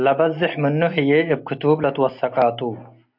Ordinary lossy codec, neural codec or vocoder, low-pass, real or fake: MP3, 48 kbps; none; 5.4 kHz; real